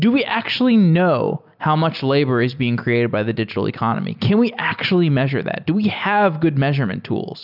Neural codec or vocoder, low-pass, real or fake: none; 5.4 kHz; real